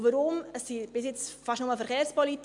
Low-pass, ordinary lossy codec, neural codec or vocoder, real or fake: 10.8 kHz; MP3, 64 kbps; none; real